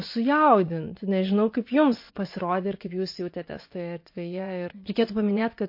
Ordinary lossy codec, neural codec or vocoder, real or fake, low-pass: MP3, 32 kbps; vocoder, 24 kHz, 100 mel bands, Vocos; fake; 5.4 kHz